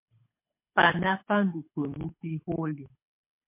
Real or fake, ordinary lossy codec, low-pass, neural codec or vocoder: fake; MP3, 24 kbps; 3.6 kHz; codec, 24 kHz, 6 kbps, HILCodec